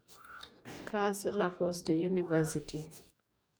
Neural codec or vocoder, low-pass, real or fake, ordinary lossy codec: codec, 44.1 kHz, 2.6 kbps, DAC; none; fake; none